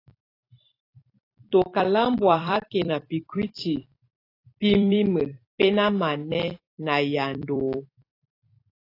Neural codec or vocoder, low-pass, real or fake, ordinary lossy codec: vocoder, 44.1 kHz, 128 mel bands every 256 samples, BigVGAN v2; 5.4 kHz; fake; AAC, 32 kbps